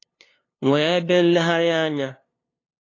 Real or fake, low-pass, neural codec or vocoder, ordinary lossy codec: fake; 7.2 kHz; codec, 16 kHz, 2 kbps, FunCodec, trained on LibriTTS, 25 frames a second; AAC, 32 kbps